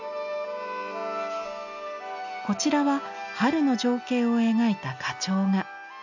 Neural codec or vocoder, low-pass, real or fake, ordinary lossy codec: none; 7.2 kHz; real; none